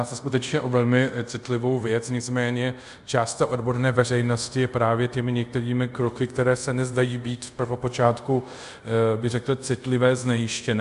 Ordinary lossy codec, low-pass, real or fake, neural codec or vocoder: MP3, 96 kbps; 10.8 kHz; fake; codec, 24 kHz, 0.5 kbps, DualCodec